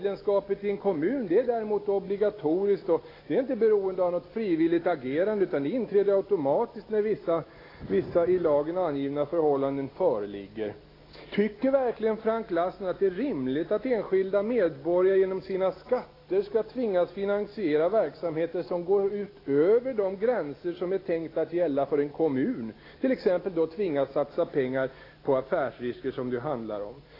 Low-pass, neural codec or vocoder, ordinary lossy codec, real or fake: 5.4 kHz; none; AAC, 24 kbps; real